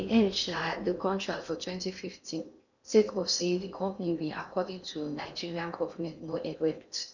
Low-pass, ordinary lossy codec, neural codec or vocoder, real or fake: 7.2 kHz; none; codec, 16 kHz in and 24 kHz out, 0.8 kbps, FocalCodec, streaming, 65536 codes; fake